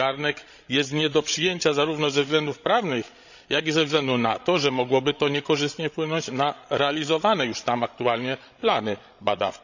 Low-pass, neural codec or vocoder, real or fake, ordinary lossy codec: 7.2 kHz; codec, 16 kHz, 16 kbps, FreqCodec, larger model; fake; none